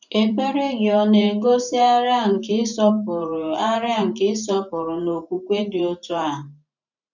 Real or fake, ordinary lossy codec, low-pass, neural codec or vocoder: fake; none; 7.2 kHz; vocoder, 44.1 kHz, 128 mel bands every 512 samples, BigVGAN v2